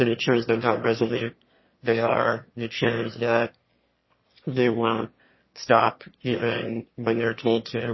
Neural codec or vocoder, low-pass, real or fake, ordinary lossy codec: autoencoder, 22.05 kHz, a latent of 192 numbers a frame, VITS, trained on one speaker; 7.2 kHz; fake; MP3, 24 kbps